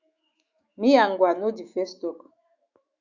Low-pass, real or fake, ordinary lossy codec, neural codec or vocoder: 7.2 kHz; fake; Opus, 64 kbps; autoencoder, 48 kHz, 128 numbers a frame, DAC-VAE, trained on Japanese speech